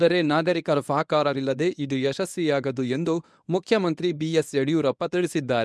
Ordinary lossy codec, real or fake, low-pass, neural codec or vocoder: none; fake; none; codec, 24 kHz, 0.9 kbps, WavTokenizer, medium speech release version 1